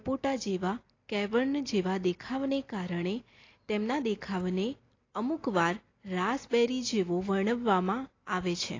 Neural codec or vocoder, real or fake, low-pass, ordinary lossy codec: none; real; 7.2 kHz; AAC, 32 kbps